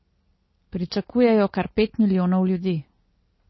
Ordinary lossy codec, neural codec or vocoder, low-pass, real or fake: MP3, 24 kbps; none; 7.2 kHz; real